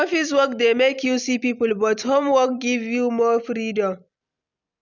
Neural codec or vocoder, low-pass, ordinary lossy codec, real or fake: none; 7.2 kHz; none; real